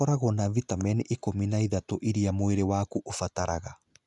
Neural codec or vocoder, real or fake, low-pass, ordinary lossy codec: none; real; 10.8 kHz; none